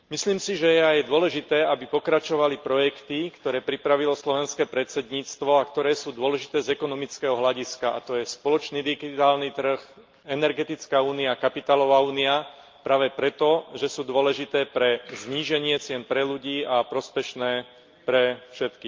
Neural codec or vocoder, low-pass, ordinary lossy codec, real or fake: none; 7.2 kHz; Opus, 32 kbps; real